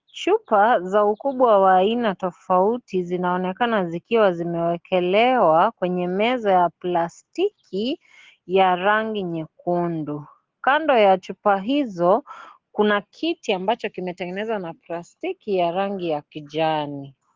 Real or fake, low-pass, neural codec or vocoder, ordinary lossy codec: real; 7.2 kHz; none; Opus, 16 kbps